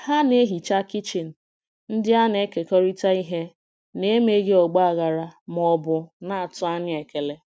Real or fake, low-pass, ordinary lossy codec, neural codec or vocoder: real; none; none; none